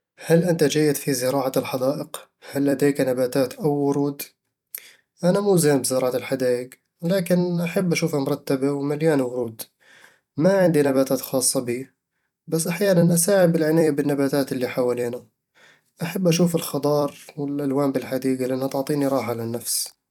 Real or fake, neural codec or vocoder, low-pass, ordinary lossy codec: fake; vocoder, 44.1 kHz, 128 mel bands every 256 samples, BigVGAN v2; 19.8 kHz; none